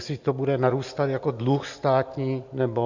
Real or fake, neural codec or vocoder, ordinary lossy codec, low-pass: real; none; Opus, 64 kbps; 7.2 kHz